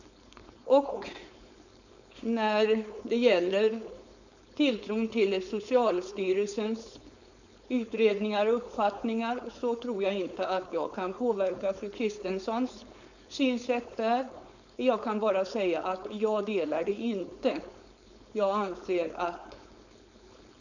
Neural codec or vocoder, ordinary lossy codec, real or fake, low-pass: codec, 16 kHz, 4.8 kbps, FACodec; none; fake; 7.2 kHz